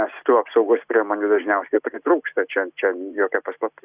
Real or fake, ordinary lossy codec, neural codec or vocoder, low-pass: real; Opus, 64 kbps; none; 3.6 kHz